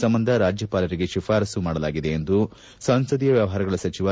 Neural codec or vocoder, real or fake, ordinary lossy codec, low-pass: none; real; none; none